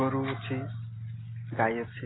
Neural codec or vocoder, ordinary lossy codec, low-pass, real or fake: none; AAC, 16 kbps; 7.2 kHz; real